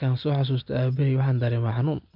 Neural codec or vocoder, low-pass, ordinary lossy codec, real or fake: none; 5.4 kHz; none; real